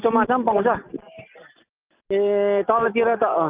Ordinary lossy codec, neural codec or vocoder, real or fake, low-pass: Opus, 32 kbps; none; real; 3.6 kHz